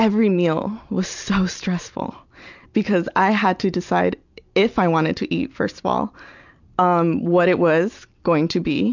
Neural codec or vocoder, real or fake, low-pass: none; real; 7.2 kHz